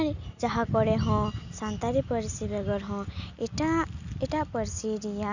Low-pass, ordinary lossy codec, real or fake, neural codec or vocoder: 7.2 kHz; none; real; none